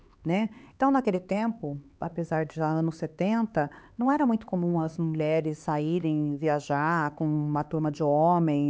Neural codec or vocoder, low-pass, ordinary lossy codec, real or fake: codec, 16 kHz, 4 kbps, X-Codec, HuBERT features, trained on LibriSpeech; none; none; fake